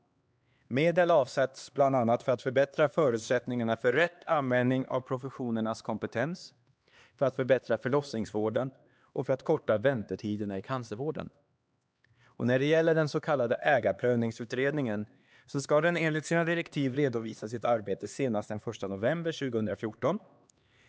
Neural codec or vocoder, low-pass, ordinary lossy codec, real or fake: codec, 16 kHz, 2 kbps, X-Codec, HuBERT features, trained on LibriSpeech; none; none; fake